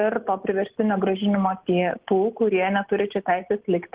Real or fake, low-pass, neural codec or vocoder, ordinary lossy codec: real; 3.6 kHz; none; Opus, 16 kbps